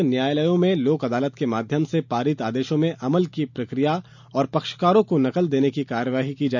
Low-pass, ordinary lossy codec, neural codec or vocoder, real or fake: 7.2 kHz; none; none; real